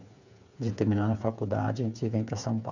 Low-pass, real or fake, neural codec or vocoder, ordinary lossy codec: 7.2 kHz; fake; codec, 16 kHz, 8 kbps, FreqCodec, smaller model; none